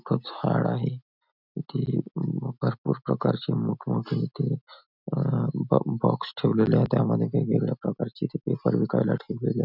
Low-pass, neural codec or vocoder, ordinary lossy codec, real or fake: 5.4 kHz; none; none; real